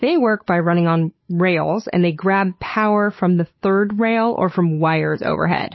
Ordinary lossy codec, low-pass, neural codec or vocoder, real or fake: MP3, 24 kbps; 7.2 kHz; codec, 16 kHz, 8 kbps, FunCodec, trained on Chinese and English, 25 frames a second; fake